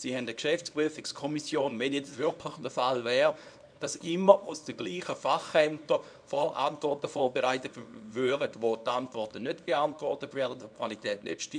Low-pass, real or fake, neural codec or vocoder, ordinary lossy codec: 9.9 kHz; fake; codec, 24 kHz, 0.9 kbps, WavTokenizer, small release; none